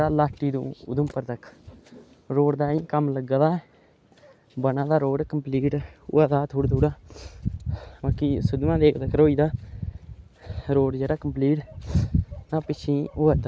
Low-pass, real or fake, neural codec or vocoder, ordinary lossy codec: none; real; none; none